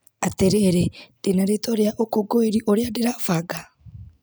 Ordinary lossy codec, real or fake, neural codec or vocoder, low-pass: none; real; none; none